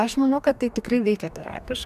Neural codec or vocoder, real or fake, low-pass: codec, 44.1 kHz, 2.6 kbps, DAC; fake; 14.4 kHz